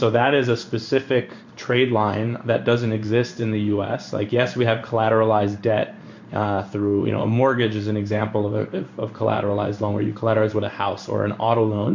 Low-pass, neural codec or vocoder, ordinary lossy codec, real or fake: 7.2 kHz; codec, 16 kHz in and 24 kHz out, 1 kbps, XY-Tokenizer; MP3, 48 kbps; fake